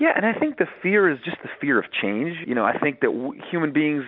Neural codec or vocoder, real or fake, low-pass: none; real; 5.4 kHz